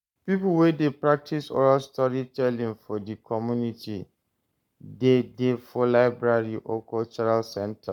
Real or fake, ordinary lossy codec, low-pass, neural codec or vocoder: fake; none; 19.8 kHz; codec, 44.1 kHz, 7.8 kbps, Pupu-Codec